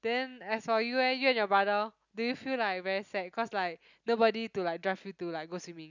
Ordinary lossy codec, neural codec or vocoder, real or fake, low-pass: none; none; real; 7.2 kHz